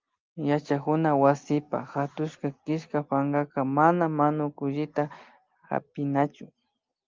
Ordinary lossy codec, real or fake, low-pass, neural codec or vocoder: Opus, 32 kbps; real; 7.2 kHz; none